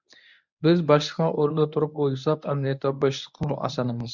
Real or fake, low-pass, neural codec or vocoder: fake; 7.2 kHz; codec, 24 kHz, 0.9 kbps, WavTokenizer, medium speech release version 1